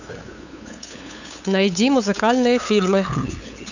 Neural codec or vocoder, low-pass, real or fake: codec, 16 kHz, 8 kbps, FunCodec, trained on LibriTTS, 25 frames a second; 7.2 kHz; fake